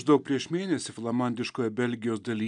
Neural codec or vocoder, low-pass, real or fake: none; 9.9 kHz; real